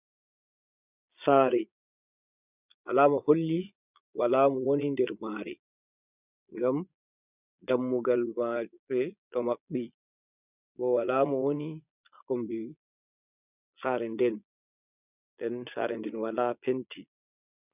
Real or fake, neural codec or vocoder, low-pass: fake; vocoder, 22.05 kHz, 80 mel bands, Vocos; 3.6 kHz